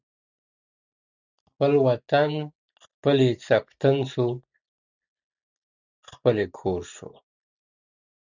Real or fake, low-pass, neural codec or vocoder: real; 7.2 kHz; none